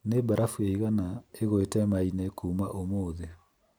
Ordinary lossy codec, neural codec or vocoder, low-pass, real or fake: none; none; none; real